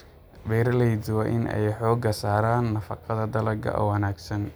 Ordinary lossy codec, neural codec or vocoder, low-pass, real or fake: none; none; none; real